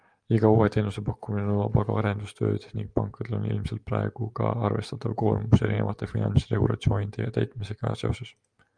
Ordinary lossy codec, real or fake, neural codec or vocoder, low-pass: Opus, 32 kbps; real; none; 9.9 kHz